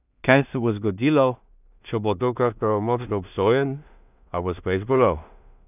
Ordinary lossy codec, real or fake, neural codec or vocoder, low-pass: none; fake; codec, 16 kHz in and 24 kHz out, 0.4 kbps, LongCat-Audio-Codec, two codebook decoder; 3.6 kHz